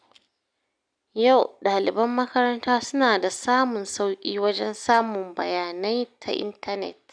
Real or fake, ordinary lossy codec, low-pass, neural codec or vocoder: real; none; 9.9 kHz; none